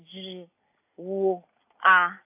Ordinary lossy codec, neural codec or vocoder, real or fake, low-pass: AAC, 24 kbps; none; real; 3.6 kHz